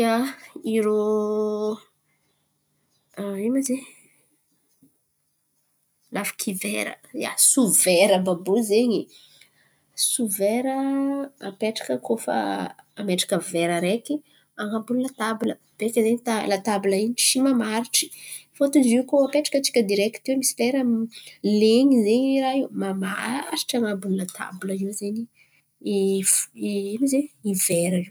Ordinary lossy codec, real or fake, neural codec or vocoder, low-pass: none; real; none; none